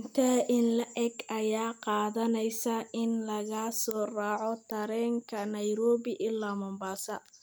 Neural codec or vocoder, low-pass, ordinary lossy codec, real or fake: none; none; none; real